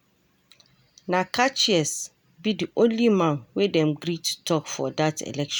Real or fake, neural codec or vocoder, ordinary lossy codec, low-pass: real; none; none; none